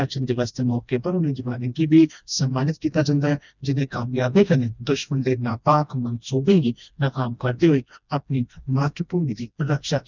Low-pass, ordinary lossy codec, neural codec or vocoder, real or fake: 7.2 kHz; none; codec, 16 kHz, 1 kbps, FreqCodec, smaller model; fake